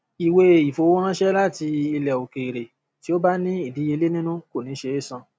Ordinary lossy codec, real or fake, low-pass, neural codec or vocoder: none; real; none; none